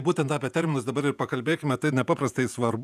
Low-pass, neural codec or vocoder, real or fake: 14.4 kHz; vocoder, 48 kHz, 128 mel bands, Vocos; fake